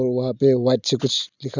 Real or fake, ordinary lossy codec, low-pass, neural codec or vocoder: real; none; 7.2 kHz; none